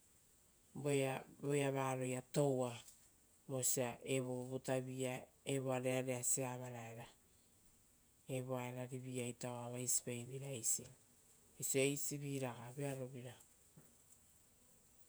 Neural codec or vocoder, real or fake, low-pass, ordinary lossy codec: vocoder, 48 kHz, 128 mel bands, Vocos; fake; none; none